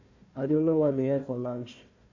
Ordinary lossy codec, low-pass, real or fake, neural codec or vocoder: none; 7.2 kHz; fake; codec, 16 kHz, 1 kbps, FunCodec, trained on Chinese and English, 50 frames a second